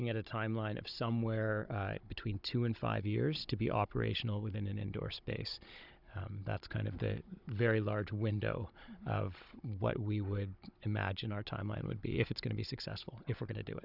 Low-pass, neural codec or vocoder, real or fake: 5.4 kHz; codec, 16 kHz, 16 kbps, FunCodec, trained on Chinese and English, 50 frames a second; fake